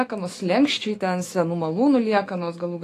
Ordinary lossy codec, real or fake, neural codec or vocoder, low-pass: AAC, 48 kbps; fake; autoencoder, 48 kHz, 128 numbers a frame, DAC-VAE, trained on Japanese speech; 14.4 kHz